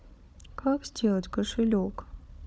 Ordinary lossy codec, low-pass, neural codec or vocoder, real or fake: none; none; codec, 16 kHz, 16 kbps, FunCodec, trained on Chinese and English, 50 frames a second; fake